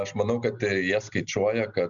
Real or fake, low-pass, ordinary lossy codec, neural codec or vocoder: real; 7.2 kHz; MP3, 96 kbps; none